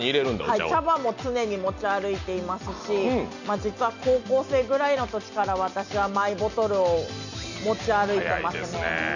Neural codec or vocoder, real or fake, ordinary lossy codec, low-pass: none; real; none; 7.2 kHz